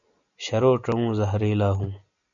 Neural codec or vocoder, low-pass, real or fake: none; 7.2 kHz; real